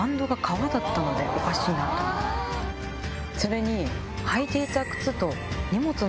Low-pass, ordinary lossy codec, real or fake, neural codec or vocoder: none; none; real; none